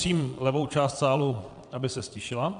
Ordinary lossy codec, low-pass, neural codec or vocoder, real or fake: AAC, 96 kbps; 9.9 kHz; vocoder, 22.05 kHz, 80 mel bands, Vocos; fake